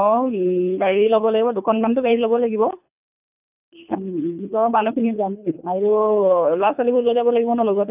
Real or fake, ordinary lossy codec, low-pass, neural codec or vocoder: fake; none; 3.6 kHz; codec, 24 kHz, 3 kbps, HILCodec